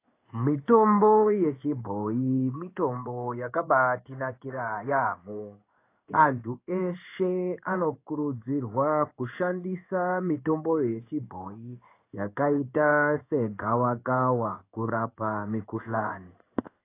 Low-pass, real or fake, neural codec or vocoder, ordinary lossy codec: 3.6 kHz; fake; codec, 16 kHz in and 24 kHz out, 1 kbps, XY-Tokenizer; AAC, 24 kbps